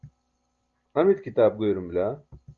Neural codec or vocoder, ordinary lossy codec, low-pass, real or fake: none; Opus, 32 kbps; 7.2 kHz; real